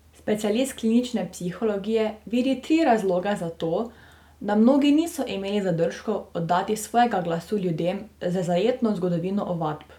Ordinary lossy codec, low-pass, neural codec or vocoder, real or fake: none; 19.8 kHz; none; real